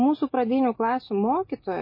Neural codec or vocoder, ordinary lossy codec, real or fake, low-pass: none; MP3, 24 kbps; real; 5.4 kHz